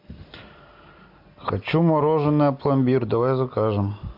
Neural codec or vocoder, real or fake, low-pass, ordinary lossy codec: none; real; 5.4 kHz; MP3, 48 kbps